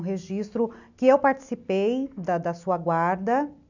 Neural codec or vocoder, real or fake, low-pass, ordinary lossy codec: none; real; 7.2 kHz; none